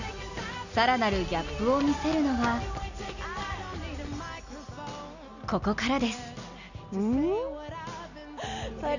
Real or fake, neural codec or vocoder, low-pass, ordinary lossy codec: real; none; 7.2 kHz; none